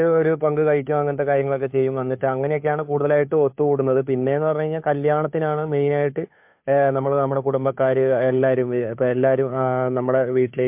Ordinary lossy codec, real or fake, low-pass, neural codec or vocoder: MP3, 32 kbps; fake; 3.6 kHz; codec, 16 kHz, 2 kbps, FunCodec, trained on Chinese and English, 25 frames a second